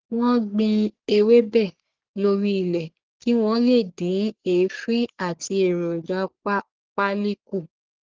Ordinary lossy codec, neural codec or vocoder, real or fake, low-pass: Opus, 16 kbps; codec, 44.1 kHz, 3.4 kbps, Pupu-Codec; fake; 7.2 kHz